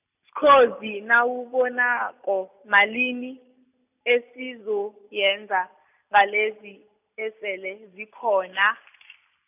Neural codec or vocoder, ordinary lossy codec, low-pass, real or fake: none; none; 3.6 kHz; real